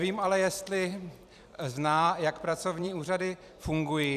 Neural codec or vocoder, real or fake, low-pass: vocoder, 44.1 kHz, 128 mel bands every 256 samples, BigVGAN v2; fake; 14.4 kHz